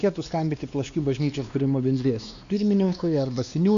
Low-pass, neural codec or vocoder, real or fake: 7.2 kHz; codec, 16 kHz, 2 kbps, X-Codec, WavLM features, trained on Multilingual LibriSpeech; fake